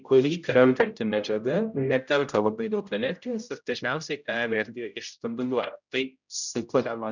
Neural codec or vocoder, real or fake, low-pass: codec, 16 kHz, 0.5 kbps, X-Codec, HuBERT features, trained on general audio; fake; 7.2 kHz